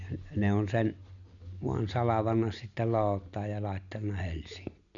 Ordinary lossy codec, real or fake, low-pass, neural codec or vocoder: none; real; 7.2 kHz; none